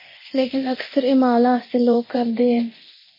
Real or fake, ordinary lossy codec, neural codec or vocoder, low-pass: fake; MP3, 24 kbps; codec, 24 kHz, 0.9 kbps, DualCodec; 5.4 kHz